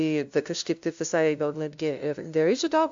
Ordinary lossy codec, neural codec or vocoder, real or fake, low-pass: AAC, 64 kbps; codec, 16 kHz, 0.5 kbps, FunCodec, trained on LibriTTS, 25 frames a second; fake; 7.2 kHz